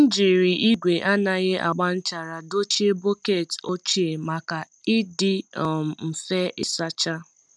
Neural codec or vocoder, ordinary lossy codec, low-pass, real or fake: none; none; none; real